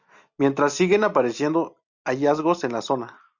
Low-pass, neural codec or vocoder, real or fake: 7.2 kHz; none; real